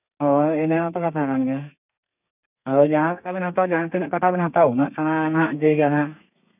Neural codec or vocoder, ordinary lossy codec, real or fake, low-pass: codec, 32 kHz, 1.9 kbps, SNAC; none; fake; 3.6 kHz